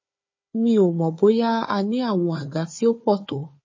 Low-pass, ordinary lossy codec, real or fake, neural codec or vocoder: 7.2 kHz; MP3, 32 kbps; fake; codec, 16 kHz, 4 kbps, FunCodec, trained on Chinese and English, 50 frames a second